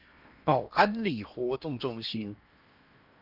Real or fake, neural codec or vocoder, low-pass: fake; codec, 16 kHz in and 24 kHz out, 0.8 kbps, FocalCodec, streaming, 65536 codes; 5.4 kHz